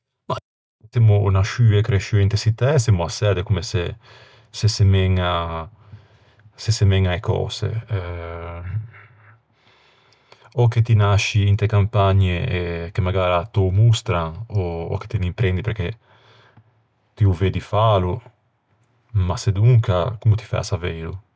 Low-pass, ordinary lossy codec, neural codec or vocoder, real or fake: none; none; none; real